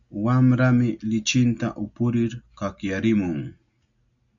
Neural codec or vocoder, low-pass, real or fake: none; 7.2 kHz; real